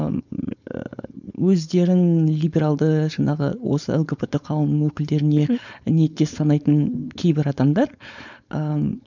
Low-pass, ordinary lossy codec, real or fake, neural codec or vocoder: 7.2 kHz; none; fake; codec, 16 kHz, 4.8 kbps, FACodec